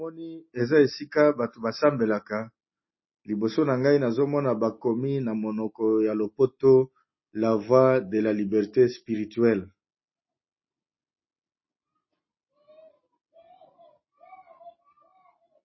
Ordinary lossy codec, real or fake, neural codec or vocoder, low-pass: MP3, 24 kbps; real; none; 7.2 kHz